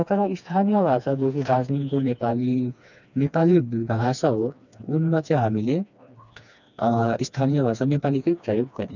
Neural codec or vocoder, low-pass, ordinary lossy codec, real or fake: codec, 16 kHz, 2 kbps, FreqCodec, smaller model; 7.2 kHz; none; fake